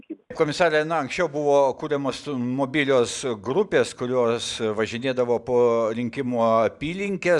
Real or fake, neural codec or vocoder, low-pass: real; none; 10.8 kHz